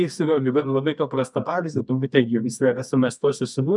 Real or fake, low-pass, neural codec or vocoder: fake; 10.8 kHz; codec, 24 kHz, 0.9 kbps, WavTokenizer, medium music audio release